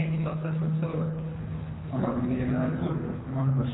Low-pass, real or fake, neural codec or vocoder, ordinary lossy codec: 7.2 kHz; fake; codec, 24 kHz, 3 kbps, HILCodec; AAC, 16 kbps